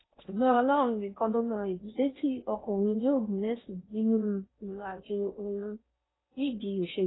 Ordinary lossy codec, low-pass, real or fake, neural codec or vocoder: AAC, 16 kbps; 7.2 kHz; fake; codec, 16 kHz in and 24 kHz out, 0.6 kbps, FocalCodec, streaming, 4096 codes